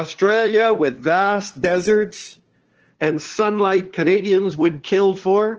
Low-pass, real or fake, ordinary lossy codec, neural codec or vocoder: 7.2 kHz; fake; Opus, 16 kbps; codec, 16 kHz, 2 kbps, FunCodec, trained on LibriTTS, 25 frames a second